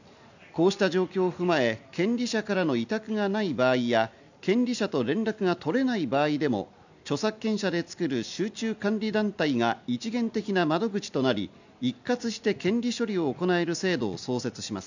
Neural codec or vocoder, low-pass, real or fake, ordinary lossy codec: none; 7.2 kHz; real; none